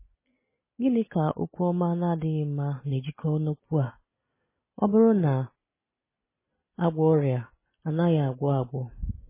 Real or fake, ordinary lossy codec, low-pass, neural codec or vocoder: real; MP3, 16 kbps; 3.6 kHz; none